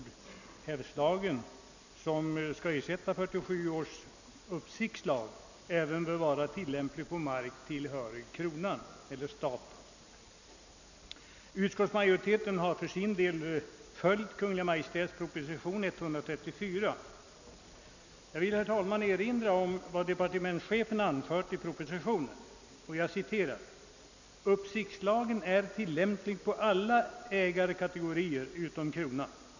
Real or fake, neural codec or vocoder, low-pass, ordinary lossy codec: real; none; 7.2 kHz; none